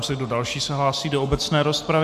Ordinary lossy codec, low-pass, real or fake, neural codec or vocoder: MP3, 96 kbps; 14.4 kHz; real; none